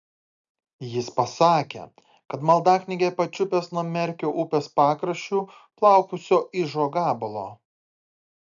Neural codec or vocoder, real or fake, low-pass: none; real; 7.2 kHz